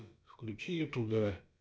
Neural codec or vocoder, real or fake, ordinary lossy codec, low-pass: codec, 16 kHz, about 1 kbps, DyCAST, with the encoder's durations; fake; none; none